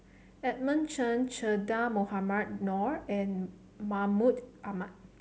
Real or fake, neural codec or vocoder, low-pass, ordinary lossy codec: real; none; none; none